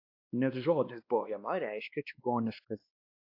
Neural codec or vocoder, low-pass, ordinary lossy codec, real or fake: codec, 16 kHz, 2 kbps, X-Codec, WavLM features, trained on Multilingual LibriSpeech; 5.4 kHz; AAC, 48 kbps; fake